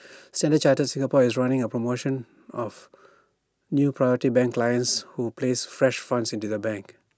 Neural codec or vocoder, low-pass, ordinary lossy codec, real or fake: none; none; none; real